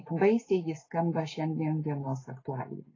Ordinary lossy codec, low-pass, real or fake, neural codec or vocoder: AAC, 32 kbps; 7.2 kHz; real; none